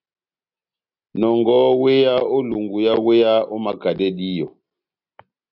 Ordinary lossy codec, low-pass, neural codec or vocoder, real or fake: AAC, 48 kbps; 5.4 kHz; none; real